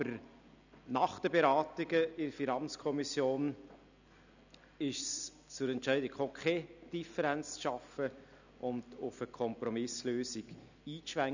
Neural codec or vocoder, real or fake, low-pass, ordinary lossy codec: none; real; 7.2 kHz; none